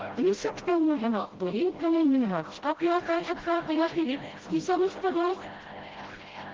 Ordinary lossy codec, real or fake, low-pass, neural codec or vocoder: Opus, 16 kbps; fake; 7.2 kHz; codec, 16 kHz, 0.5 kbps, FreqCodec, smaller model